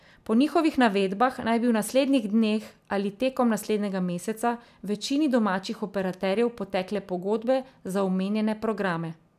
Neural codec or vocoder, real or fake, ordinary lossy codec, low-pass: none; real; none; 14.4 kHz